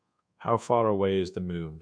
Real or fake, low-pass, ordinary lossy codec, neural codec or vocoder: fake; 9.9 kHz; none; codec, 24 kHz, 1.2 kbps, DualCodec